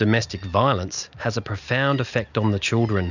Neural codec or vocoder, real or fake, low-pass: none; real; 7.2 kHz